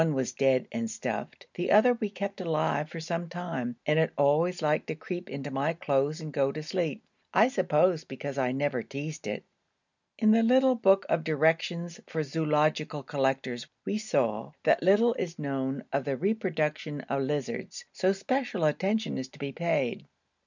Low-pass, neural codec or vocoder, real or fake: 7.2 kHz; none; real